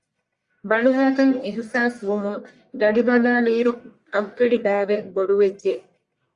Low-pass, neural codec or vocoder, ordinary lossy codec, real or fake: 10.8 kHz; codec, 44.1 kHz, 1.7 kbps, Pupu-Codec; Opus, 64 kbps; fake